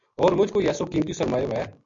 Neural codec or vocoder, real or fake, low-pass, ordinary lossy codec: none; real; 7.2 kHz; MP3, 64 kbps